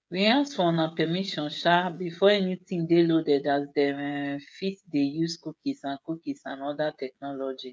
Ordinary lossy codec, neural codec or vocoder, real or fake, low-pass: none; codec, 16 kHz, 16 kbps, FreqCodec, smaller model; fake; none